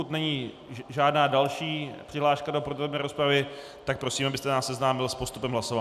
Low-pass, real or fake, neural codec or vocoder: 14.4 kHz; real; none